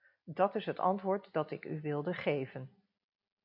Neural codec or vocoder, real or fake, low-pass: none; real; 5.4 kHz